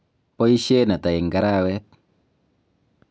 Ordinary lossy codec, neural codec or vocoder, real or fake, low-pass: none; none; real; none